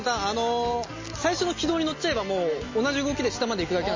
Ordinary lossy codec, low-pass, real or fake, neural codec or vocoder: MP3, 32 kbps; 7.2 kHz; real; none